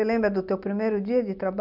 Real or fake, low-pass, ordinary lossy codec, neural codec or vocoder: real; 5.4 kHz; none; none